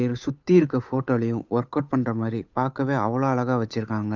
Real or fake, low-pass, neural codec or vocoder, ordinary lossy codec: fake; 7.2 kHz; codec, 16 kHz, 8 kbps, FunCodec, trained on Chinese and English, 25 frames a second; none